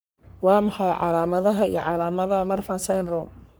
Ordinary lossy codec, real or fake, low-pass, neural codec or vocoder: none; fake; none; codec, 44.1 kHz, 3.4 kbps, Pupu-Codec